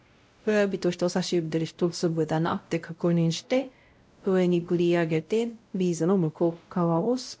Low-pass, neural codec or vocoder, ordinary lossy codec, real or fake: none; codec, 16 kHz, 0.5 kbps, X-Codec, WavLM features, trained on Multilingual LibriSpeech; none; fake